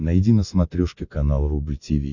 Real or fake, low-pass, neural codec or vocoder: real; 7.2 kHz; none